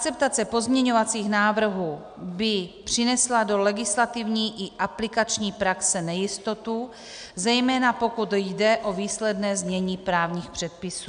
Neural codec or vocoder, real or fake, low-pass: none; real; 9.9 kHz